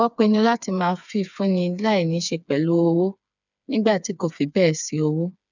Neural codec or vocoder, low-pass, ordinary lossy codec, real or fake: codec, 16 kHz, 4 kbps, FreqCodec, smaller model; 7.2 kHz; none; fake